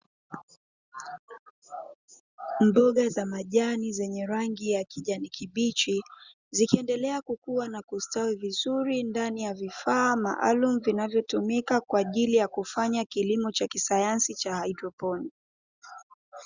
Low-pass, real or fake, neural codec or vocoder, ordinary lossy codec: 7.2 kHz; real; none; Opus, 64 kbps